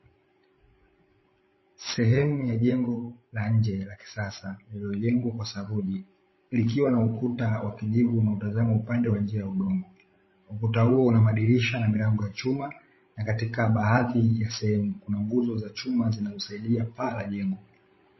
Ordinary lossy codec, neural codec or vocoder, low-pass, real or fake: MP3, 24 kbps; codec, 16 kHz, 16 kbps, FreqCodec, larger model; 7.2 kHz; fake